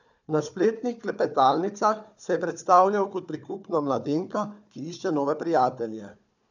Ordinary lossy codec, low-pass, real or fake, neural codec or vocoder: none; 7.2 kHz; fake; codec, 16 kHz, 4 kbps, FunCodec, trained on Chinese and English, 50 frames a second